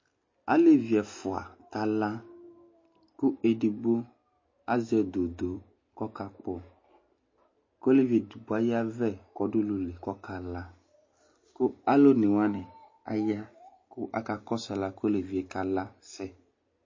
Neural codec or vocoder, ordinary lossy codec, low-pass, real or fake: none; MP3, 32 kbps; 7.2 kHz; real